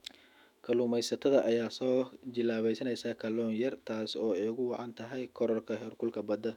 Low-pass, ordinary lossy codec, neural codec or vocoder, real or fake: 19.8 kHz; none; autoencoder, 48 kHz, 128 numbers a frame, DAC-VAE, trained on Japanese speech; fake